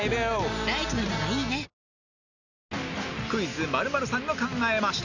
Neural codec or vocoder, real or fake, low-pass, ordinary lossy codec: none; real; 7.2 kHz; none